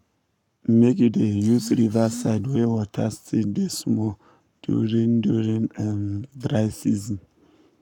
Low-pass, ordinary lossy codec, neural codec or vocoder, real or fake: 19.8 kHz; none; codec, 44.1 kHz, 7.8 kbps, Pupu-Codec; fake